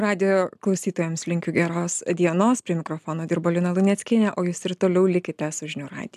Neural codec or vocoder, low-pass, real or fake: none; 14.4 kHz; real